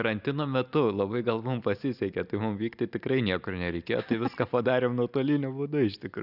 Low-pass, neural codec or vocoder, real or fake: 5.4 kHz; none; real